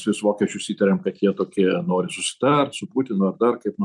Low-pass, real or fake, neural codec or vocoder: 10.8 kHz; real; none